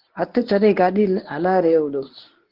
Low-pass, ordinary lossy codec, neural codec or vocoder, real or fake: 5.4 kHz; Opus, 16 kbps; codec, 24 kHz, 0.9 kbps, WavTokenizer, medium speech release version 1; fake